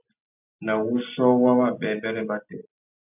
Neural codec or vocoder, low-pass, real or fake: none; 3.6 kHz; real